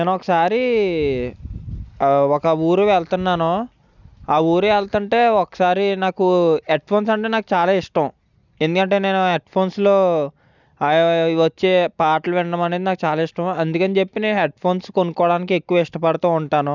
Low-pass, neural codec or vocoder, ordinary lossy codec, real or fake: 7.2 kHz; none; none; real